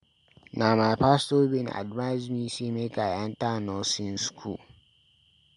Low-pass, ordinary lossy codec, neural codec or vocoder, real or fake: 10.8 kHz; MP3, 64 kbps; none; real